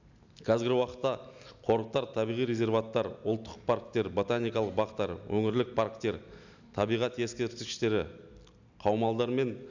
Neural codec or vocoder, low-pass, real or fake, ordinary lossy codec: none; 7.2 kHz; real; none